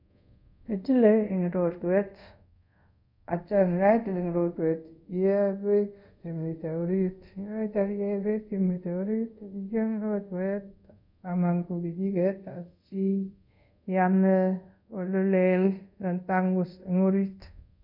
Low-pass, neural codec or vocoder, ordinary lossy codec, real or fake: 5.4 kHz; codec, 24 kHz, 0.5 kbps, DualCodec; none; fake